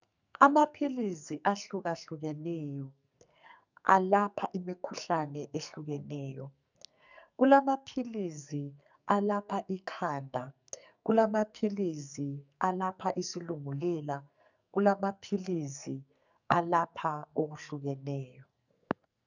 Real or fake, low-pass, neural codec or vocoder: fake; 7.2 kHz; codec, 44.1 kHz, 2.6 kbps, SNAC